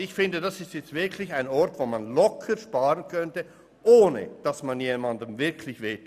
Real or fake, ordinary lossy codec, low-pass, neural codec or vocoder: real; none; 14.4 kHz; none